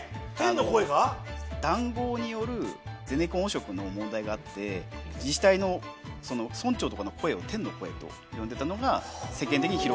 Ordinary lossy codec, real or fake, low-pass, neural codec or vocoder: none; real; none; none